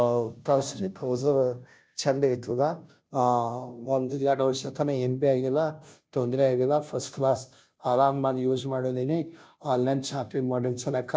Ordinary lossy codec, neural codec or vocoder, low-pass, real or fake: none; codec, 16 kHz, 0.5 kbps, FunCodec, trained on Chinese and English, 25 frames a second; none; fake